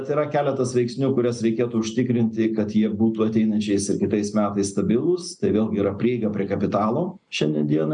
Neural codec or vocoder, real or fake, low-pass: none; real; 9.9 kHz